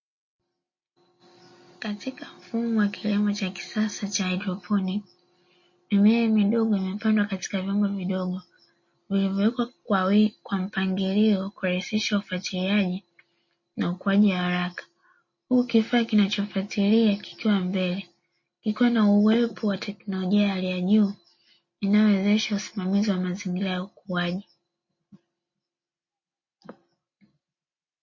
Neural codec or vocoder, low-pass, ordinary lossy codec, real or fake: none; 7.2 kHz; MP3, 32 kbps; real